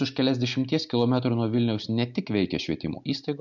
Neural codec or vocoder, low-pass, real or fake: none; 7.2 kHz; real